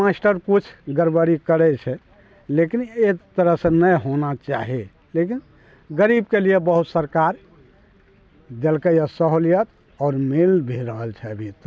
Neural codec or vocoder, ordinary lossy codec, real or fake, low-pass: none; none; real; none